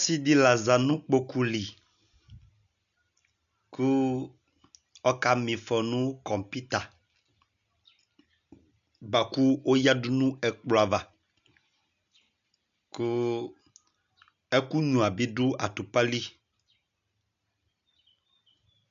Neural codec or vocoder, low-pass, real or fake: none; 7.2 kHz; real